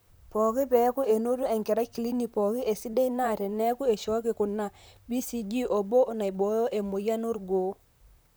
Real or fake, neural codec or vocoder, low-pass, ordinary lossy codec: fake; vocoder, 44.1 kHz, 128 mel bands, Pupu-Vocoder; none; none